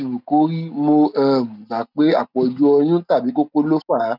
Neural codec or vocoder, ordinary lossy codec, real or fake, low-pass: none; none; real; 5.4 kHz